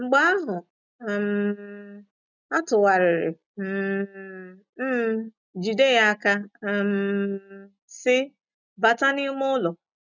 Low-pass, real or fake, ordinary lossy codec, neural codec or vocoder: 7.2 kHz; real; none; none